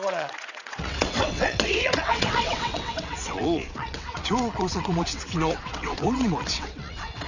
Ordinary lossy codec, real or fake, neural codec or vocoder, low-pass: none; fake; vocoder, 22.05 kHz, 80 mel bands, WaveNeXt; 7.2 kHz